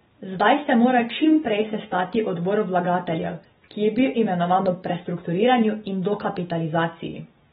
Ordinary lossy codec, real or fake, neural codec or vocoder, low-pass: AAC, 16 kbps; real; none; 19.8 kHz